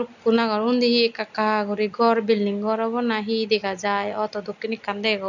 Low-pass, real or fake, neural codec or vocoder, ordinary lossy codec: 7.2 kHz; real; none; none